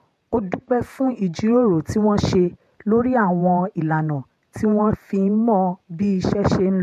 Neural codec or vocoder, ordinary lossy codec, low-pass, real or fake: vocoder, 48 kHz, 128 mel bands, Vocos; MP3, 64 kbps; 14.4 kHz; fake